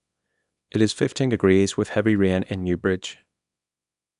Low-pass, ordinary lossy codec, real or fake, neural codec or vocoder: 10.8 kHz; none; fake; codec, 24 kHz, 0.9 kbps, WavTokenizer, small release